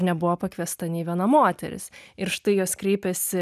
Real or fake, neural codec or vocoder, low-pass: real; none; 14.4 kHz